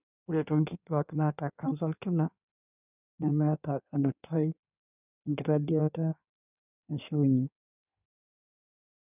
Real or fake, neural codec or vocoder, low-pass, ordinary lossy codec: fake; codec, 16 kHz in and 24 kHz out, 1.1 kbps, FireRedTTS-2 codec; 3.6 kHz; none